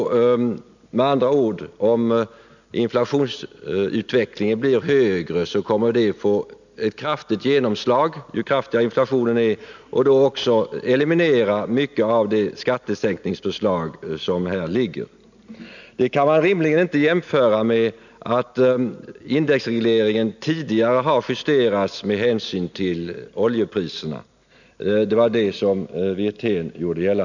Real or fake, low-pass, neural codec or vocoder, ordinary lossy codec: real; 7.2 kHz; none; none